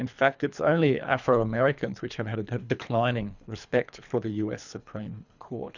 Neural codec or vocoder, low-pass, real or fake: codec, 24 kHz, 3 kbps, HILCodec; 7.2 kHz; fake